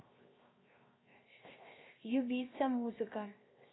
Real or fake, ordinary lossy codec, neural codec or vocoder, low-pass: fake; AAC, 16 kbps; codec, 16 kHz, 0.7 kbps, FocalCodec; 7.2 kHz